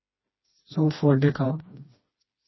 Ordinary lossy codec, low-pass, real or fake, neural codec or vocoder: MP3, 24 kbps; 7.2 kHz; fake; codec, 16 kHz, 2 kbps, FreqCodec, smaller model